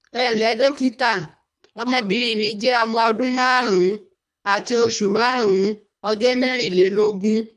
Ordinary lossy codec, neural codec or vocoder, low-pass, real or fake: none; codec, 24 kHz, 1.5 kbps, HILCodec; none; fake